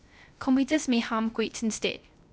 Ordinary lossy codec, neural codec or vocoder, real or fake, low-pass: none; codec, 16 kHz, 0.3 kbps, FocalCodec; fake; none